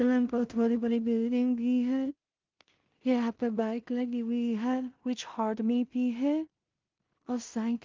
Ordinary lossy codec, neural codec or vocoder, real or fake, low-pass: Opus, 24 kbps; codec, 16 kHz in and 24 kHz out, 0.4 kbps, LongCat-Audio-Codec, two codebook decoder; fake; 7.2 kHz